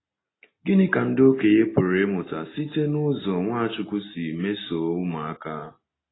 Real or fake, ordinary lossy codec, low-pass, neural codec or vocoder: real; AAC, 16 kbps; 7.2 kHz; none